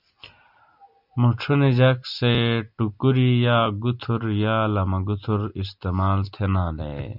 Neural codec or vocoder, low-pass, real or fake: none; 5.4 kHz; real